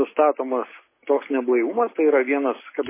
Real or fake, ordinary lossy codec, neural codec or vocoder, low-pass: real; MP3, 16 kbps; none; 3.6 kHz